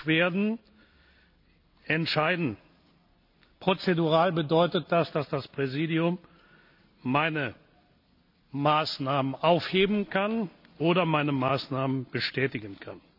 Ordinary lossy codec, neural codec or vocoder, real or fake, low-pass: none; none; real; 5.4 kHz